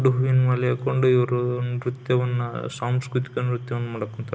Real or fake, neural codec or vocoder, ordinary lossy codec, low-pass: real; none; none; none